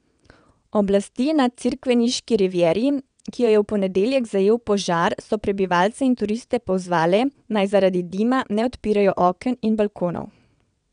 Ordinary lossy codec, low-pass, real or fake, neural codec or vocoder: none; 9.9 kHz; fake; vocoder, 22.05 kHz, 80 mel bands, WaveNeXt